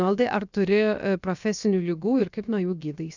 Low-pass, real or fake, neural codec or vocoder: 7.2 kHz; fake; codec, 16 kHz, 0.7 kbps, FocalCodec